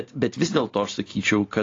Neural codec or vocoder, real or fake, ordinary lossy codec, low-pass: none; real; AAC, 32 kbps; 7.2 kHz